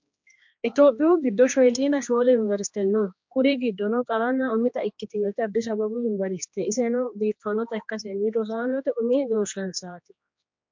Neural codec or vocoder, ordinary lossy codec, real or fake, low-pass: codec, 16 kHz, 2 kbps, X-Codec, HuBERT features, trained on general audio; MP3, 48 kbps; fake; 7.2 kHz